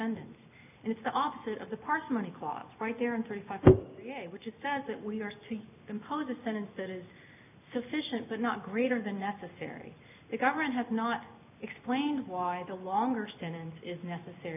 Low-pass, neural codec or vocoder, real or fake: 3.6 kHz; none; real